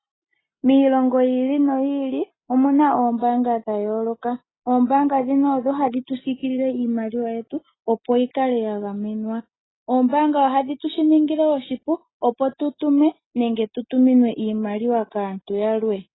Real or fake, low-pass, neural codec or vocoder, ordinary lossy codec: real; 7.2 kHz; none; AAC, 16 kbps